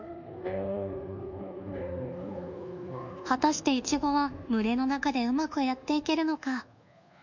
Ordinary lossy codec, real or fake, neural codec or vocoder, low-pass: none; fake; codec, 24 kHz, 1.2 kbps, DualCodec; 7.2 kHz